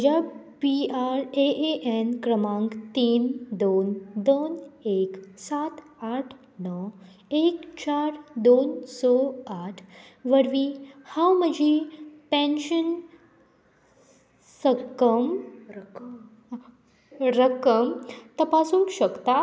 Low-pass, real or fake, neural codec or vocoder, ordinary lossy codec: none; real; none; none